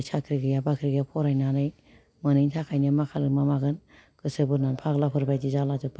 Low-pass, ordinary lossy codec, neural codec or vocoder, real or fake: none; none; none; real